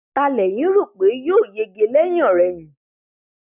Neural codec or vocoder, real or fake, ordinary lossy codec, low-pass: none; real; none; 3.6 kHz